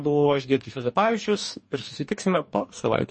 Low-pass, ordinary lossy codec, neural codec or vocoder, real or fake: 10.8 kHz; MP3, 32 kbps; codec, 44.1 kHz, 2.6 kbps, DAC; fake